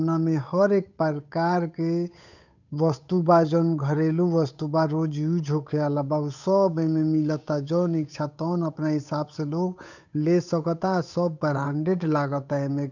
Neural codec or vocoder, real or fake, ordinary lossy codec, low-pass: codec, 16 kHz, 8 kbps, FunCodec, trained on Chinese and English, 25 frames a second; fake; none; 7.2 kHz